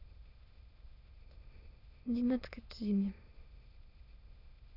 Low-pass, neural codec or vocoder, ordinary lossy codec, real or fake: 5.4 kHz; autoencoder, 22.05 kHz, a latent of 192 numbers a frame, VITS, trained on many speakers; none; fake